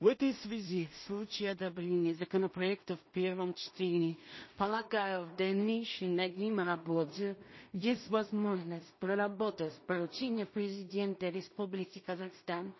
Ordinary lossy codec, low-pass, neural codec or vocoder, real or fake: MP3, 24 kbps; 7.2 kHz; codec, 16 kHz in and 24 kHz out, 0.4 kbps, LongCat-Audio-Codec, two codebook decoder; fake